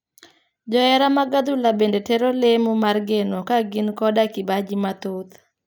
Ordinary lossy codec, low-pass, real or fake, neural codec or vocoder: none; none; real; none